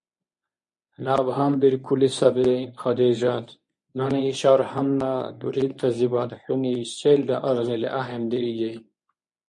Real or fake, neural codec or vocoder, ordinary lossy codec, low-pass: fake; codec, 24 kHz, 0.9 kbps, WavTokenizer, medium speech release version 1; MP3, 48 kbps; 10.8 kHz